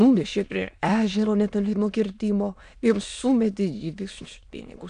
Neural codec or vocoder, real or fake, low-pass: autoencoder, 22.05 kHz, a latent of 192 numbers a frame, VITS, trained on many speakers; fake; 9.9 kHz